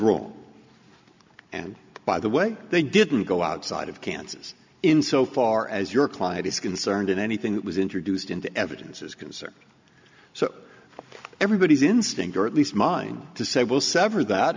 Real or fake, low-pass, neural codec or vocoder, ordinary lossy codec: real; 7.2 kHz; none; MP3, 64 kbps